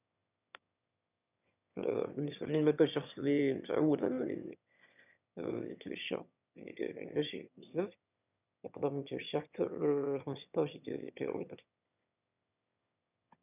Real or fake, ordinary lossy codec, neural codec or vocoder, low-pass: fake; none; autoencoder, 22.05 kHz, a latent of 192 numbers a frame, VITS, trained on one speaker; 3.6 kHz